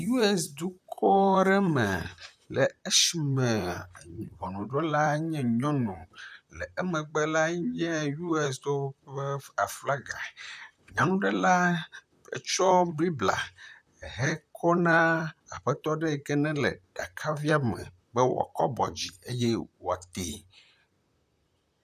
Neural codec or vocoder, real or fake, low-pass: vocoder, 44.1 kHz, 128 mel bands, Pupu-Vocoder; fake; 14.4 kHz